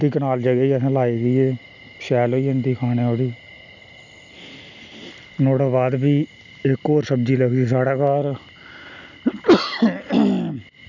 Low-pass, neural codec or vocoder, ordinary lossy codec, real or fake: 7.2 kHz; none; none; real